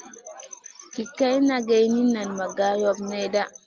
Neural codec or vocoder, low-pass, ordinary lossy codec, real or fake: none; 7.2 kHz; Opus, 32 kbps; real